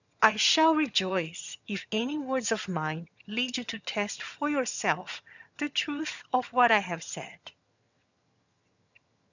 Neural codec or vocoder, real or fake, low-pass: vocoder, 22.05 kHz, 80 mel bands, HiFi-GAN; fake; 7.2 kHz